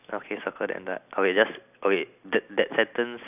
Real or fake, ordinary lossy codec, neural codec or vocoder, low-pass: real; none; none; 3.6 kHz